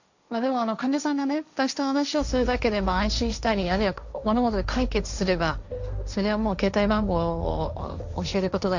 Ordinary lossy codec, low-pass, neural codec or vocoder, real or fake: none; 7.2 kHz; codec, 16 kHz, 1.1 kbps, Voila-Tokenizer; fake